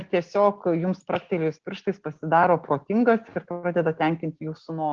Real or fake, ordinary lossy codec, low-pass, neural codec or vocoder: real; Opus, 16 kbps; 7.2 kHz; none